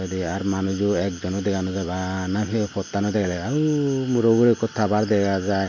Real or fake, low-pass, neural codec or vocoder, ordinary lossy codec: real; 7.2 kHz; none; MP3, 64 kbps